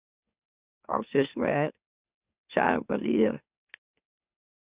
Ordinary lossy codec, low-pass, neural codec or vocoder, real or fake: Opus, 64 kbps; 3.6 kHz; autoencoder, 44.1 kHz, a latent of 192 numbers a frame, MeloTTS; fake